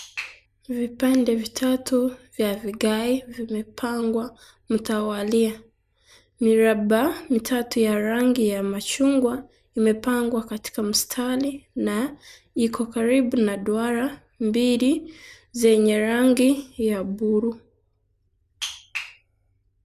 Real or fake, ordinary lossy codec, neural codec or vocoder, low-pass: real; none; none; 14.4 kHz